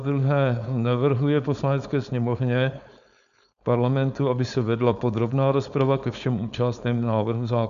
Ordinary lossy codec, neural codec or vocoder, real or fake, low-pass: MP3, 96 kbps; codec, 16 kHz, 4.8 kbps, FACodec; fake; 7.2 kHz